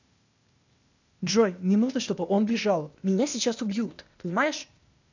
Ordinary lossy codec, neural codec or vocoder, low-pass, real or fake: none; codec, 16 kHz, 0.8 kbps, ZipCodec; 7.2 kHz; fake